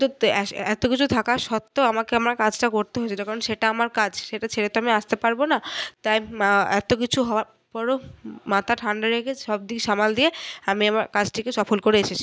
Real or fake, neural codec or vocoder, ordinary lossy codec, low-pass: real; none; none; none